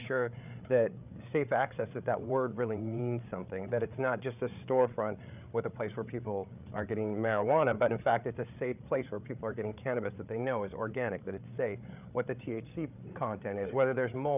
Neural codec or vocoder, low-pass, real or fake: codec, 16 kHz, 8 kbps, FreqCodec, larger model; 3.6 kHz; fake